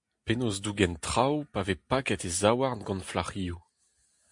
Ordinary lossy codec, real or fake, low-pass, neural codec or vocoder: MP3, 64 kbps; real; 10.8 kHz; none